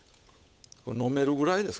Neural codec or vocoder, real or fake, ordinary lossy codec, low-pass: codec, 16 kHz, 8 kbps, FunCodec, trained on Chinese and English, 25 frames a second; fake; none; none